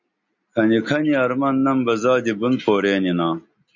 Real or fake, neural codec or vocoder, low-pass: real; none; 7.2 kHz